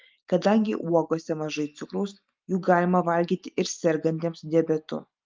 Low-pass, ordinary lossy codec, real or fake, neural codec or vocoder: 7.2 kHz; Opus, 32 kbps; real; none